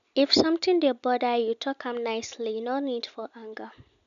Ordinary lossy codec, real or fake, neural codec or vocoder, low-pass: none; real; none; 7.2 kHz